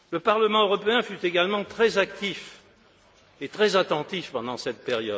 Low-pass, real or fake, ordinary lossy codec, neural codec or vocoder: none; real; none; none